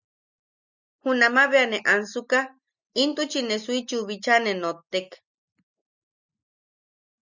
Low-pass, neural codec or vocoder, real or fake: 7.2 kHz; none; real